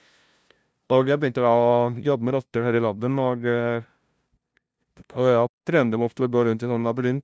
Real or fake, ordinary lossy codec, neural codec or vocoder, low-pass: fake; none; codec, 16 kHz, 0.5 kbps, FunCodec, trained on LibriTTS, 25 frames a second; none